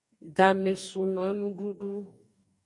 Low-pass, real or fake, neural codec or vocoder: 10.8 kHz; fake; codec, 44.1 kHz, 2.6 kbps, DAC